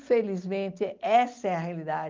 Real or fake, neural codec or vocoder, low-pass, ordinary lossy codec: real; none; 7.2 kHz; Opus, 32 kbps